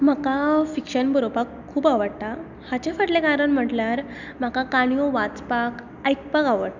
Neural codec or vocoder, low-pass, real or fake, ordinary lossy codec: none; 7.2 kHz; real; none